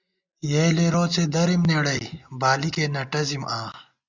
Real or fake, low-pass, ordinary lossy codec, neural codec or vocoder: real; 7.2 kHz; Opus, 64 kbps; none